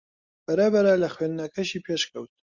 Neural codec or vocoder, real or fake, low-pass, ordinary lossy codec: none; real; 7.2 kHz; Opus, 64 kbps